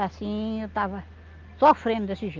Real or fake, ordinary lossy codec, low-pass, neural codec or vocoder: real; Opus, 32 kbps; 7.2 kHz; none